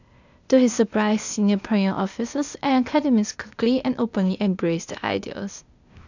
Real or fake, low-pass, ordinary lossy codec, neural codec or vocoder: fake; 7.2 kHz; none; codec, 16 kHz, 0.8 kbps, ZipCodec